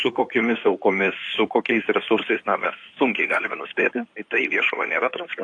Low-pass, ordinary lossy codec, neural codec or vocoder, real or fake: 9.9 kHz; AAC, 48 kbps; codec, 16 kHz in and 24 kHz out, 2.2 kbps, FireRedTTS-2 codec; fake